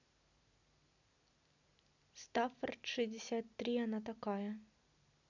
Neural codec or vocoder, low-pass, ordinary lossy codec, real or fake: none; 7.2 kHz; none; real